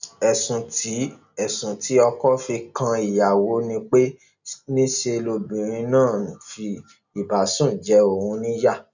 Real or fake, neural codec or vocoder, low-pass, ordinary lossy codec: real; none; 7.2 kHz; none